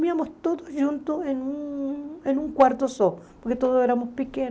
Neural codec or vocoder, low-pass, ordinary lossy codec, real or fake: none; none; none; real